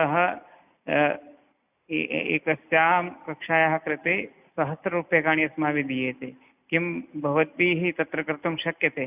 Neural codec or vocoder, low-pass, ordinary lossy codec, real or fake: none; 3.6 kHz; none; real